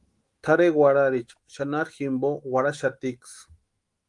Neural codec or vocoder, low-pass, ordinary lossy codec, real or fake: autoencoder, 48 kHz, 128 numbers a frame, DAC-VAE, trained on Japanese speech; 10.8 kHz; Opus, 24 kbps; fake